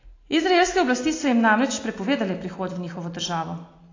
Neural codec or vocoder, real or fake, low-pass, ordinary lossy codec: none; real; 7.2 kHz; AAC, 32 kbps